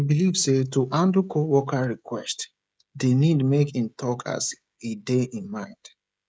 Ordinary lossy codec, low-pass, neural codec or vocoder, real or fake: none; none; codec, 16 kHz, 16 kbps, FreqCodec, smaller model; fake